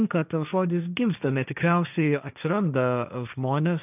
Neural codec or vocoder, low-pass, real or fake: codec, 16 kHz, 1.1 kbps, Voila-Tokenizer; 3.6 kHz; fake